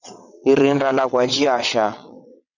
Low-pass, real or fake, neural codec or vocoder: 7.2 kHz; fake; vocoder, 22.05 kHz, 80 mel bands, WaveNeXt